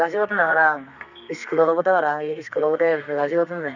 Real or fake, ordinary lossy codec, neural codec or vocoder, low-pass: fake; none; codec, 32 kHz, 1.9 kbps, SNAC; 7.2 kHz